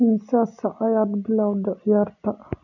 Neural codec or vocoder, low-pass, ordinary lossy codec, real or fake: none; 7.2 kHz; none; real